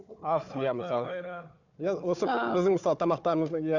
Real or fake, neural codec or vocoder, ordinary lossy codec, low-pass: fake; codec, 16 kHz, 4 kbps, FunCodec, trained on Chinese and English, 50 frames a second; none; 7.2 kHz